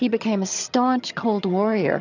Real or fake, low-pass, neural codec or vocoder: fake; 7.2 kHz; vocoder, 22.05 kHz, 80 mel bands, HiFi-GAN